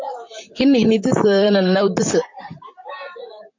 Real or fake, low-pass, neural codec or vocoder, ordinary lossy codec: fake; 7.2 kHz; vocoder, 44.1 kHz, 128 mel bands, Pupu-Vocoder; MP3, 48 kbps